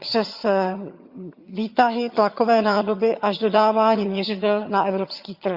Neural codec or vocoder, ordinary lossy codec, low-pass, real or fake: vocoder, 22.05 kHz, 80 mel bands, HiFi-GAN; Opus, 64 kbps; 5.4 kHz; fake